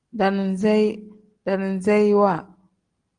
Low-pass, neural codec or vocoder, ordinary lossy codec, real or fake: 9.9 kHz; none; Opus, 24 kbps; real